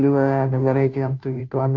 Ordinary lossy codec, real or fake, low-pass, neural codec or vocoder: none; fake; 7.2 kHz; codec, 16 kHz, 0.5 kbps, FunCodec, trained on Chinese and English, 25 frames a second